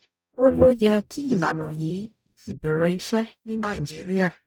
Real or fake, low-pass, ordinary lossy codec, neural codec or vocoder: fake; 19.8 kHz; none; codec, 44.1 kHz, 0.9 kbps, DAC